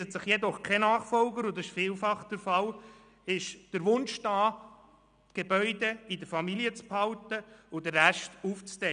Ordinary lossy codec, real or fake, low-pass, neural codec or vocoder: none; real; 9.9 kHz; none